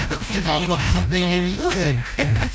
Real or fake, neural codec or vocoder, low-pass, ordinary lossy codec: fake; codec, 16 kHz, 0.5 kbps, FreqCodec, larger model; none; none